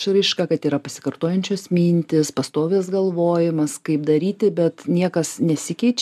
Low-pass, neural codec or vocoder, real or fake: 14.4 kHz; none; real